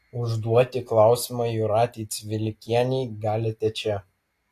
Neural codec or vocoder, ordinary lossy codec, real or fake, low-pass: none; AAC, 48 kbps; real; 14.4 kHz